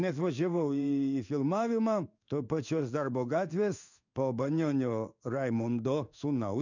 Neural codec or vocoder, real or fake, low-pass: codec, 16 kHz in and 24 kHz out, 1 kbps, XY-Tokenizer; fake; 7.2 kHz